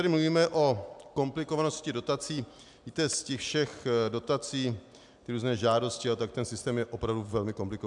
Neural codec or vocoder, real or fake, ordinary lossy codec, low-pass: none; real; MP3, 96 kbps; 10.8 kHz